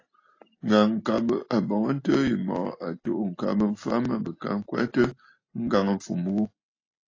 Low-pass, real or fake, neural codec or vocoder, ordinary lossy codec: 7.2 kHz; real; none; AAC, 32 kbps